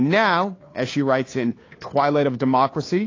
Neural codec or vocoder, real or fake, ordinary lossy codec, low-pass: codec, 16 kHz, 2 kbps, FunCodec, trained on Chinese and English, 25 frames a second; fake; AAC, 32 kbps; 7.2 kHz